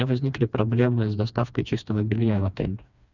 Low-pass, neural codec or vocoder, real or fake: 7.2 kHz; codec, 16 kHz, 2 kbps, FreqCodec, smaller model; fake